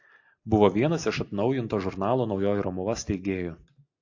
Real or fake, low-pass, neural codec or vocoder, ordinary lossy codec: real; 7.2 kHz; none; AAC, 48 kbps